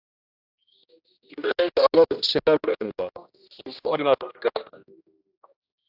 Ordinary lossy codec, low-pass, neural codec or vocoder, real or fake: AAC, 48 kbps; 5.4 kHz; codec, 16 kHz, 1 kbps, X-Codec, HuBERT features, trained on general audio; fake